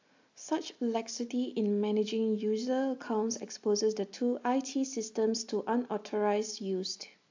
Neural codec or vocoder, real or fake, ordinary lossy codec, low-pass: none; real; AAC, 48 kbps; 7.2 kHz